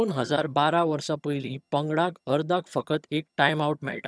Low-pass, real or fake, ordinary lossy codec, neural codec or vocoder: none; fake; none; vocoder, 22.05 kHz, 80 mel bands, HiFi-GAN